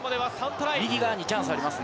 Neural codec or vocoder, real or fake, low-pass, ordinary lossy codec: none; real; none; none